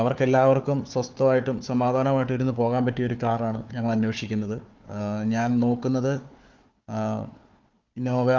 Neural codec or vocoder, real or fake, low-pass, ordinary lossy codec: codec, 16 kHz, 4 kbps, FunCodec, trained on Chinese and English, 50 frames a second; fake; 7.2 kHz; Opus, 32 kbps